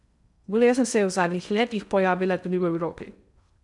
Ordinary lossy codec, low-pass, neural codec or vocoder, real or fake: none; 10.8 kHz; codec, 16 kHz in and 24 kHz out, 0.6 kbps, FocalCodec, streaming, 2048 codes; fake